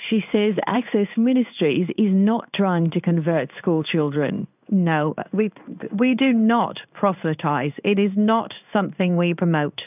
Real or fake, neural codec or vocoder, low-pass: fake; codec, 16 kHz in and 24 kHz out, 1 kbps, XY-Tokenizer; 3.6 kHz